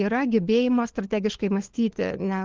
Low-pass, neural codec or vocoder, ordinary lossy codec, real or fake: 7.2 kHz; codec, 16 kHz, 4 kbps, FunCodec, trained on LibriTTS, 50 frames a second; Opus, 16 kbps; fake